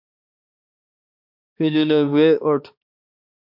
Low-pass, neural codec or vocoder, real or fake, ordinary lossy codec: 5.4 kHz; codec, 16 kHz, 2 kbps, X-Codec, HuBERT features, trained on balanced general audio; fake; MP3, 48 kbps